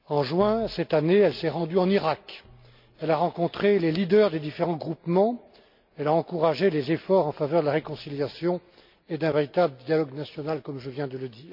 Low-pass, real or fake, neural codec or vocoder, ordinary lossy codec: 5.4 kHz; real; none; MP3, 48 kbps